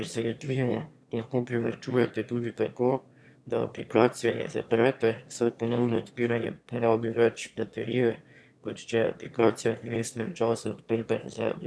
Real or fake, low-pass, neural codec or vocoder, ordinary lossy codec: fake; none; autoencoder, 22.05 kHz, a latent of 192 numbers a frame, VITS, trained on one speaker; none